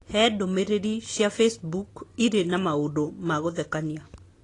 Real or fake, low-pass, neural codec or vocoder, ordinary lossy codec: real; 10.8 kHz; none; AAC, 32 kbps